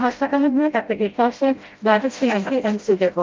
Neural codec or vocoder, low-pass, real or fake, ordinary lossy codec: codec, 16 kHz, 0.5 kbps, FreqCodec, smaller model; 7.2 kHz; fake; Opus, 24 kbps